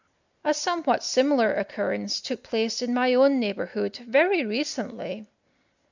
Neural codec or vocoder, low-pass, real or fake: none; 7.2 kHz; real